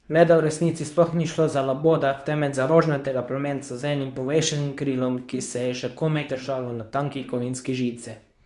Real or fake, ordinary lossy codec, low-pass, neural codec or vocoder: fake; none; 10.8 kHz; codec, 24 kHz, 0.9 kbps, WavTokenizer, medium speech release version 2